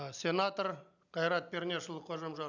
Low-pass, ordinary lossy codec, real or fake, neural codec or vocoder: 7.2 kHz; none; real; none